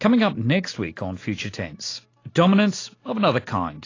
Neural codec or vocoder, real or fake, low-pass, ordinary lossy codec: none; real; 7.2 kHz; AAC, 32 kbps